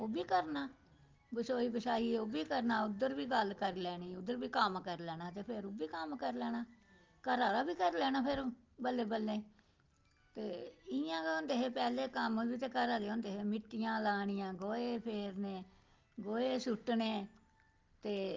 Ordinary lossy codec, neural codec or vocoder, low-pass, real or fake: Opus, 32 kbps; none; 7.2 kHz; real